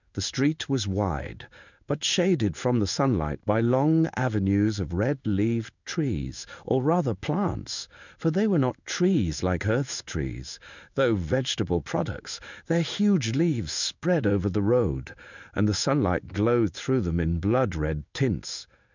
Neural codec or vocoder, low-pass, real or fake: codec, 16 kHz in and 24 kHz out, 1 kbps, XY-Tokenizer; 7.2 kHz; fake